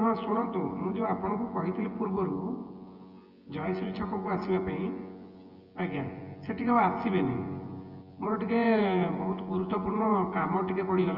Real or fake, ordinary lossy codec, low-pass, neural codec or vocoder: fake; Opus, 24 kbps; 5.4 kHz; vocoder, 24 kHz, 100 mel bands, Vocos